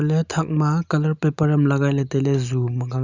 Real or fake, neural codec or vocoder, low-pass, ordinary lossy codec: real; none; 7.2 kHz; Opus, 64 kbps